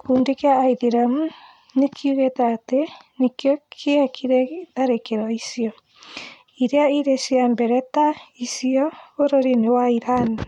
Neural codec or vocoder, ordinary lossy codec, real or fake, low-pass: vocoder, 44.1 kHz, 128 mel bands every 256 samples, BigVGAN v2; MP3, 96 kbps; fake; 19.8 kHz